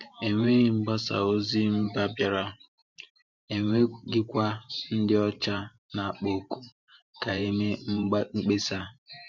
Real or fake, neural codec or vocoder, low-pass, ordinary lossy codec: fake; vocoder, 44.1 kHz, 128 mel bands every 512 samples, BigVGAN v2; 7.2 kHz; none